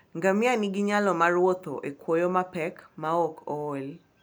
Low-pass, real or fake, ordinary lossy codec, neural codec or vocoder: none; real; none; none